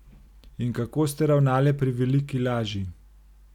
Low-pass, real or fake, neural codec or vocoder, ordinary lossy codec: 19.8 kHz; real; none; none